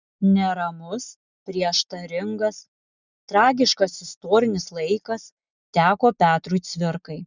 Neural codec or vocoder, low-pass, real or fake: none; 7.2 kHz; real